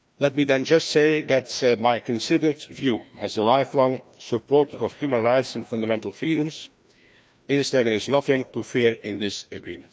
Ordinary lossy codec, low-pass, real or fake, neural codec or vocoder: none; none; fake; codec, 16 kHz, 1 kbps, FreqCodec, larger model